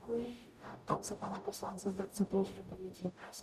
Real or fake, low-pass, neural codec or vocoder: fake; 14.4 kHz; codec, 44.1 kHz, 0.9 kbps, DAC